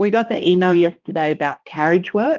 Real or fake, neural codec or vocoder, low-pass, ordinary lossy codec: fake; codec, 16 kHz, 1 kbps, X-Codec, HuBERT features, trained on balanced general audio; 7.2 kHz; Opus, 24 kbps